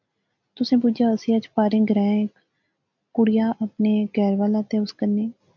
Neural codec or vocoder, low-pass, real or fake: none; 7.2 kHz; real